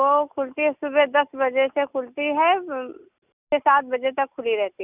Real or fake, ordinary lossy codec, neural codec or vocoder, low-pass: real; none; none; 3.6 kHz